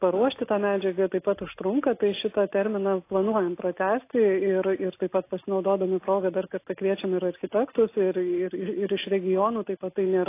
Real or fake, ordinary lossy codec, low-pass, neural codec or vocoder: real; AAC, 24 kbps; 3.6 kHz; none